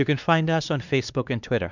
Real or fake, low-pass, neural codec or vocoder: fake; 7.2 kHz; codec, 16 kHz, 2 kbps, X-Codec, WavLM features, trained on Multilingual LibriSpeech